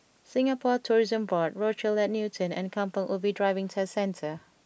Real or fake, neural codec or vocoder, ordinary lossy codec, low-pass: real; none; none; none